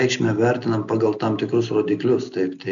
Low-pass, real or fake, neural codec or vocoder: 7.2 kHz; real; none